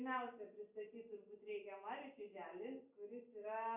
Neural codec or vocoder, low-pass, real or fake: none; 3.6 kHz; real